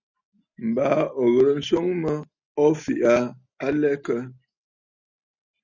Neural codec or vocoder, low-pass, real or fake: none; 7.2 kHz; real